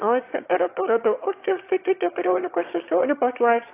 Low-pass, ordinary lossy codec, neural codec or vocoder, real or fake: 3.6 kHz; AAC, 24 kbps; autoencoder, 22.05 kHz, a latent of 192 numbers a frame, VITS, trained on one speaker; fake